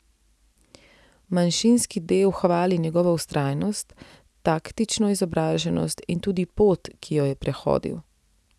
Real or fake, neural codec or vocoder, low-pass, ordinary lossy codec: real; none; none; none